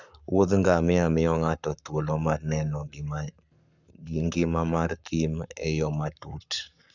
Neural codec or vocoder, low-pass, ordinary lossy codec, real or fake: codec, 44.1 kHz, 7.8 kbps, Pupu-Codec; 7.2 kHz; none; fake